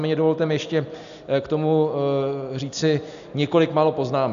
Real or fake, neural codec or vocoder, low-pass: real; none; 7.2 kHz